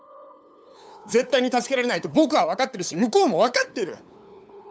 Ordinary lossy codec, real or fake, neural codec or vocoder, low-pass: none; fake; codec, 16 kHz, 8 kbps, FunCodec, trained on LibriTTS, 25 frames a second; none